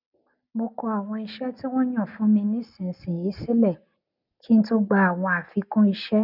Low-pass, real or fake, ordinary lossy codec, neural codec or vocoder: 5.4 kHz; real; none; none